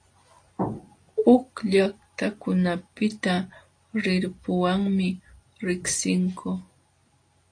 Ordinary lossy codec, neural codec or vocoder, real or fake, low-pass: AAC, 48 kbps; none; real; 9.9 kHz